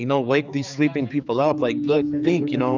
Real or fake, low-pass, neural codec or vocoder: fake; 7.2 kHz; codec, 16 kHz, 4 kbps, X-Codec, HuBERT features, trained on general audio